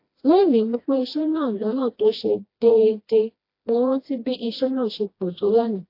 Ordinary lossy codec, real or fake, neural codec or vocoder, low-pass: AAC, 32 kbps; fake; codec, 16 kHz, 1 kbps, FreqCodec, smaller model; 5.4 kHz